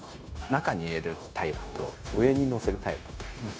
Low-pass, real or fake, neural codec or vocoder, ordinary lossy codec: none; fake; codec, 16 kHz, 0.9 kbps, LongCat-Audio-Codec; none